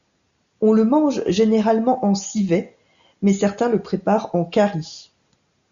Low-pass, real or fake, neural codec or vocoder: 7.2 kHz; real; none